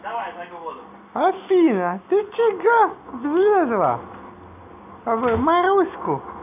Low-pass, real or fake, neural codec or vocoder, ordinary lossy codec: 3.6 kHz; real; none; none